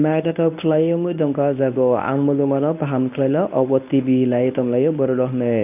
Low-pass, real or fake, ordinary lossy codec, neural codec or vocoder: 3.6 kHz; fake; none; codec, 24 kHz, 0.9 kbps, WavTokenizer, medium speech release version 1